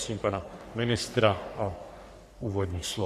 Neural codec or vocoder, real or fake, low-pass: codec, 44.1 kHz, 3.4 kbps, Pupu-Codec; fake; 14.4 kHz